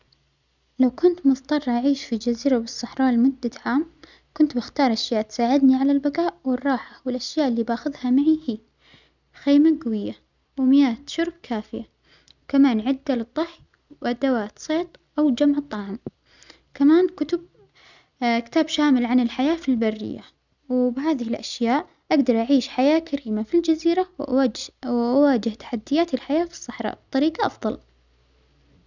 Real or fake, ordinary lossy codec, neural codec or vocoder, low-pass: real; none; none; 7.2 kHz